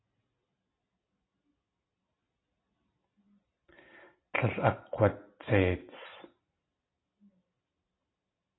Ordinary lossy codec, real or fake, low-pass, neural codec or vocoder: AAC, 16 kbps; real; 7.2 kHz; none